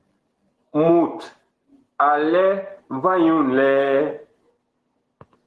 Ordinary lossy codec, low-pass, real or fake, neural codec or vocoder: Opus, 16 kbps; 10.8 kHz; real; none